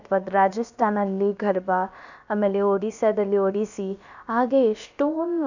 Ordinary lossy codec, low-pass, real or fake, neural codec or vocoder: none; 7.2 kHz; fake; codec, 16 kHz, about 1 kbps, DyCAST, with the encoder's durations